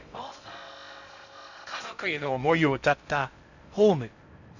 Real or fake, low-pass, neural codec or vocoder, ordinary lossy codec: fake; 7.2 kHz; codec, 16 kHz in and 24 kHz out, 0.6 kbps, FocalCodec, streaming, 2048 codes; none